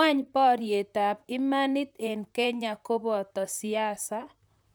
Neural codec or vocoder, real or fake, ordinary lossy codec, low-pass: vocoder, 44.1 kHz, 128 mel bands, Pupu-Vocoder; fake; none; none